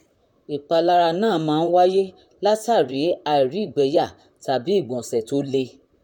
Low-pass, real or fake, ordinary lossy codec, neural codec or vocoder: 19.8 kHz; fake; none; vocoder, 44.1 kHz, 128 mel bands, Pupu-Vocoder